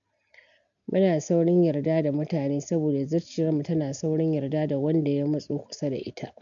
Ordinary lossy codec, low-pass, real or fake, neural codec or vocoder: none; 7.2 kHz; real; none